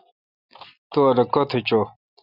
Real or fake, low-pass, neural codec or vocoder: real; 5.4 kHz; none